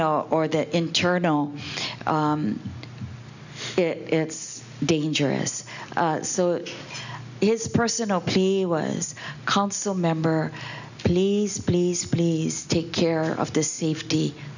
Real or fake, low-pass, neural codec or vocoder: real; 7.2 kHz; none